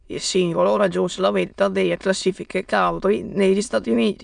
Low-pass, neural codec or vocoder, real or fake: 9.9 kHz; autoencoder, 22.05 kHz, a latent of 192 numbers a frame, VITS, trained on many speakers; fake